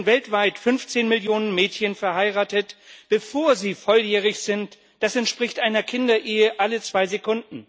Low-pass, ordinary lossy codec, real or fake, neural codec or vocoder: none; none; real; none